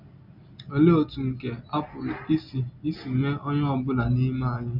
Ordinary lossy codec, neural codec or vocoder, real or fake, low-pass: none; none; real; 5.4 kHz